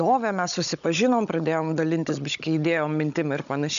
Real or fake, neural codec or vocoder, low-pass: fake; codec, 16 kHz, 16 kbps, FunCodec, trained on Chinese and English, 50 frames a second; 7.2 kHz